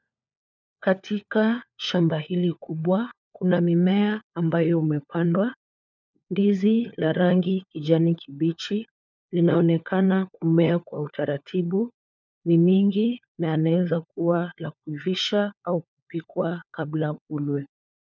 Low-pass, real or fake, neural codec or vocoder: 7.2 kHz; fake; codec, 16 kHz, 4 kbps, FunCodec, trained on LibriTTS, 50 frames a second